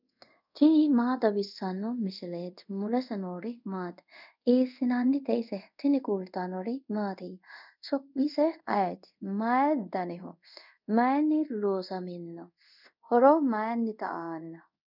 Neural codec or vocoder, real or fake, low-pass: codec, 24 kHz, 0.5 kbps, DualCodec; fake; 5.4 kHz